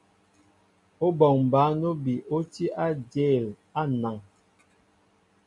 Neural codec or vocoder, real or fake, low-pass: none; real; 10.8 kHz